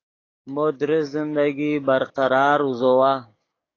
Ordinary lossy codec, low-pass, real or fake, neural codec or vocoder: AAC, 32 kbps; 7.2 kHz; fake; codec, 44.1 kHz, 7.8 kbps, DAC